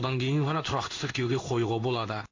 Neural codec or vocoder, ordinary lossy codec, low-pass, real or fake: codec, 16 kHz in and 24 kHz out, 1 kbps, XY-Tokenizer; MP3, 48 kbps; 7.2 kHz; fake